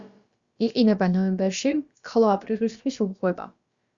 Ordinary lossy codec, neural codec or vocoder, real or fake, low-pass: Opus, 64 kbps; codec, 16 kHz, about 1 kbps, DyCAST, with the encoder's durations; fake; 7.2 kHz